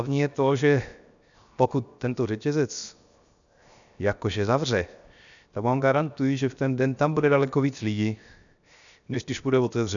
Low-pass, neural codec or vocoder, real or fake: 7.2 kHz; codec, 16 kHz, 0.7 kbps, FocalCodec; fake